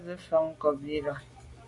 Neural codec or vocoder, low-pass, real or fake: none; 10.8 kHz; real